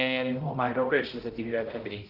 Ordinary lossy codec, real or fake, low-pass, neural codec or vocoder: Opus, 16 kbps; fake; 5.4 kHz; codec, 16 kHz, 0.5 kbps, X-Codec, HuBERT features, trained on balanced general audio